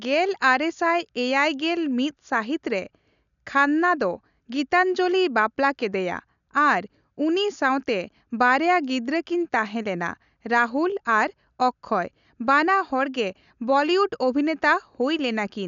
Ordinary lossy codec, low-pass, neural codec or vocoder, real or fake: none; 7.2 kHz; none; real